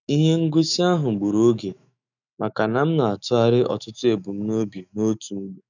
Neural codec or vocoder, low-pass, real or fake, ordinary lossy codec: autoencoder, 48 kHz, 128 numbers a frame, DAC-VAE, trained on Japanese speech; 7.2 kHz; fake; none